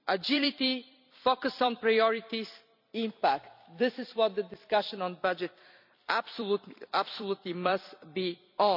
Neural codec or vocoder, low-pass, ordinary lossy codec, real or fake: vocoder, 44.1 kHz, 128 mel bands every 256 samples, BigVGAN v2; 5.4 kHz; none; fake